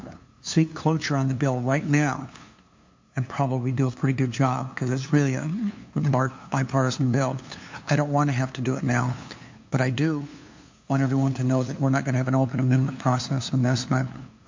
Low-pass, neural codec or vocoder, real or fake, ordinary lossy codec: 7.2 kHz; codec, 16 kHz, 2 kbps, FunCodec, trained on LibriTTS, 25 frames a second; fake; MP3, 48 kbps